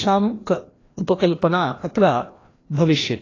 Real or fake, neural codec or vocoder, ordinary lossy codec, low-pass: fake; codec, 16 kHz, 1 kbps, FreqCodec, larger model; AAC, 32 kbps; 7.2 kHz